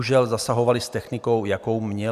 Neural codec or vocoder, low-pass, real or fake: vocoder, 44.1 kHz, 128 mel bands every 256 samples, BigVGAN v2; 14.4 kHz; fake